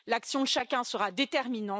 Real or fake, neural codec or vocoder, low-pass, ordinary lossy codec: real; none; none; none